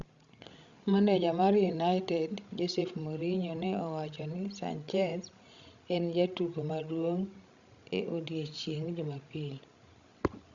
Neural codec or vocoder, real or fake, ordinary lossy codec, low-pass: codec, 16 kHz, 16 kbps, FreqCodec, larger model; fake; Opus, 64 kbps; 7.2 kHz